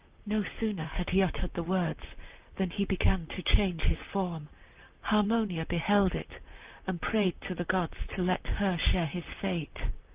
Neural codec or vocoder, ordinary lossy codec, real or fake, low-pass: vocoder, 44.1 kHz, 128 mel bands, Pupu-Vocoder; Opus, 24 kbps; fake; 3.6 kHz